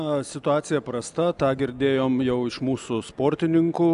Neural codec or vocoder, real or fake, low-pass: none; real; 10.8 kHz